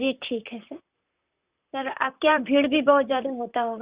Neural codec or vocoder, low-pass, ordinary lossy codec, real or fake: vocoder, 44.1 kHz, 128 mel bands, Pupu-Vocoder; 3.6 kHz; Opus, 64 kbps; fake